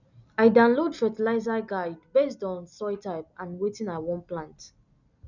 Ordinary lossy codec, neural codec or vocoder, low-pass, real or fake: none; none; 7.2 kHz; real